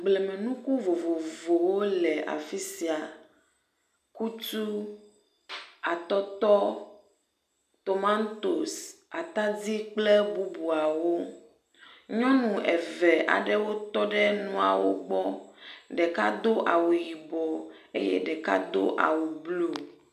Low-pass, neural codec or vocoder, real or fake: 14.4 kHz; none; real